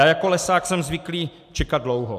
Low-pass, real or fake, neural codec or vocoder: 14.4 kHz; real; none